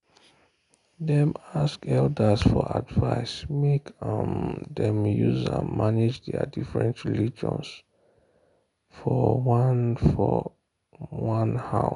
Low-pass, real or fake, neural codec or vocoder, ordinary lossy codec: 10.8 kHz; real; none; none